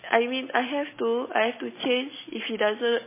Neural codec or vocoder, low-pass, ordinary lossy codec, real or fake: none; 3.6 kHz; MP3, 16 kbps; real